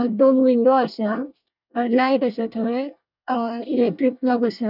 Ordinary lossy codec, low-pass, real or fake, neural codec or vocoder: none; 5.4 kHz; fake; codec, 24 kHz, 1 kbps, SNAC